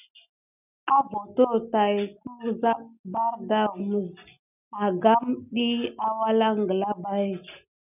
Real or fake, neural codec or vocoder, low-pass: real; none; 3.6 kHz